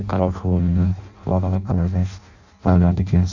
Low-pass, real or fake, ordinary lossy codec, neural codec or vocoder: 7.2 kHz; fake; none; codec, 16 kHz in and 24 kHz out, 0.6 kbps, FireRedTTS-2 codec